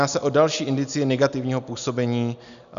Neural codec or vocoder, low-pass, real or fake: none; 7.2 kHz; real